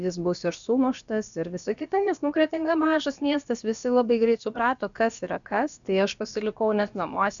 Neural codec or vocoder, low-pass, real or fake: codec, 16 kHz, about 1 kbps, DyCAST, with the encoder's durations; 7.2 kHz; fake